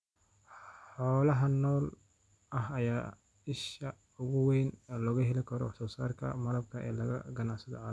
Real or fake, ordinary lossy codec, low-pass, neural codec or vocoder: real; none; none; none